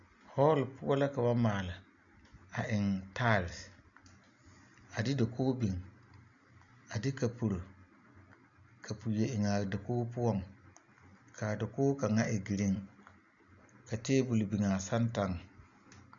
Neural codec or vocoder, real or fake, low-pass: none; real; 7.2 kHz